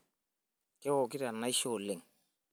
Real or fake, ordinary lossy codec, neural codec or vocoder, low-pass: real; none; none; none